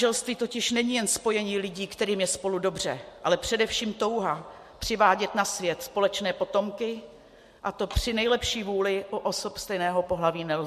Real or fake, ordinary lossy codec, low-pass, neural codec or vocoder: real; MP3, 64 kbps; 14.4 kHz; none